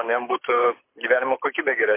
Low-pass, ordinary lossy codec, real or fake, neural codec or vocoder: 3.6 kHz; MP3, 24 kbps; fake; codec, 24 kHz, 6 kbps, HILCodec